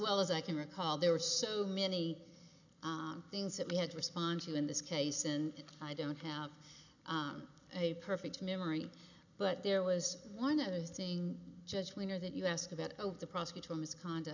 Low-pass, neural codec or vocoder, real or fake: 7.2 kHz; none; real